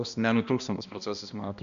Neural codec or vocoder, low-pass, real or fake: codec, 16 kHz, 1 kbps, X-Codec, HuBERT features, trained on balanced general audio; 7.2 kHz; fake